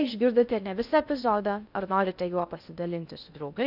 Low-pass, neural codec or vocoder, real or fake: 5.4 kHz; codec, 16 kHz in and 24 kHz out, 0.6 kbps, FocalCodec, streaming, 2048 codes; fake